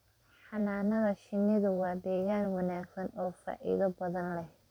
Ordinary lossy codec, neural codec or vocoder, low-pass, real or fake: none; vocoder, 44.1 kHz, 128 mel bands every 512 samples, BigVGAN v2; 19.8 kHz; fake